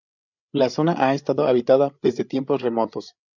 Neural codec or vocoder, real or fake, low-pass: codec, 16 kHz, 8 kbps, FreqCodec, larger model; fake; 7.2 kHz